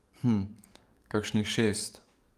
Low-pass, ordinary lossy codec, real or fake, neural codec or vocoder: 14.4 kHz; Opus, 32 kbps; fake; codec, 44.1 kHz, 7.8 kbps, DAC